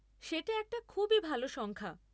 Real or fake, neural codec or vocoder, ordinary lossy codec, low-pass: real; none; none; none